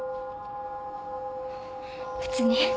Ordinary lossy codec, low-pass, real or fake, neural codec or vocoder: none; none; real; none